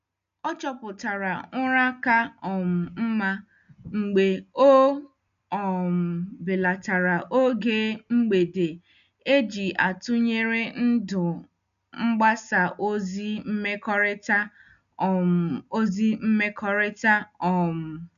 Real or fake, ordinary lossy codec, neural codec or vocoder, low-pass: real; MP3, 96 kbps; none; 7.2 kHz